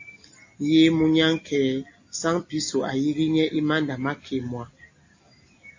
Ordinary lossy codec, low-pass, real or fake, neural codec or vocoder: AAC, 48 kbps; 7.2 kHz; real; none